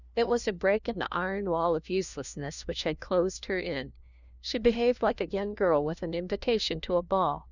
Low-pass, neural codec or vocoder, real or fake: 7.2 kHz; codec, 16 kHz, 1 kbps, FunCodec, trained on LibriTTS, 50 frames a second; fake